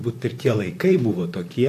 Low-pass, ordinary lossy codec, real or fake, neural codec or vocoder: 14.4 kHz; AAC, 64 kbps; fake; vocoder, 44.1 kHz, 128 mel bands, Pupu-Vocoder